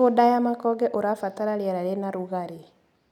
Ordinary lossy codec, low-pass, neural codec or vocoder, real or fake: none; 19.8 kHz; none; real